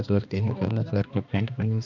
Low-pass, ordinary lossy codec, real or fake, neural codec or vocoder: 7.2 kHz; none; fake; codec, 16 kHz, 2 kbps, X-Codec, HuBERT features, trained on balanced general audio